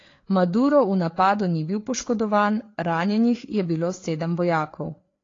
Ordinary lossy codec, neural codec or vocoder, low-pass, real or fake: AAC, 32 kbps; codec, 16 kHz, 4 kbps, FreqCodec, larger model; 7.2 kHz; fake